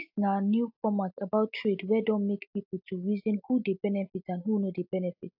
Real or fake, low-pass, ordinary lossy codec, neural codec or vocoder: real; 5.4 kHz; AAC, 48 kbps; none